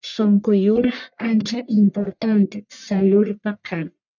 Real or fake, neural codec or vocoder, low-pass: fake; codec, 44.1 kHz, 1.7 kbps, Pupu-Codec; 7.2 kHz